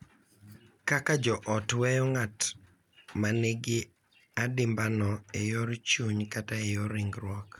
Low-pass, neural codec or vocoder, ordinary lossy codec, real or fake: 19.8 kHz; none; none; real